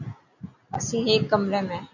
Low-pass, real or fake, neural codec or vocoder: 7.2 kHz; real; none